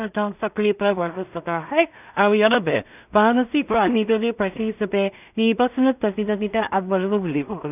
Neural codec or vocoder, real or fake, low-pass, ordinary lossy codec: codec, 16 kHz in and 24 kHz out, 0.4 kbps, LongCat-Audio-Codec, two codebook decoder; fake; 3.6 kHz; none